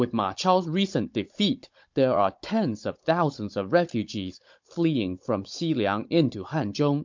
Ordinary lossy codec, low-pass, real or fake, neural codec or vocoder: MP3, 48 kbps; 7.2 kHz; fake; codec, 16 kHz, 16 kbps, FunCodec, trained on Chinese and English, 50 frames a second